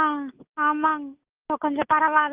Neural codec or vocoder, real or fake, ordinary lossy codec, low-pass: none; real; Opus, 24 kbps; 3.6 kHz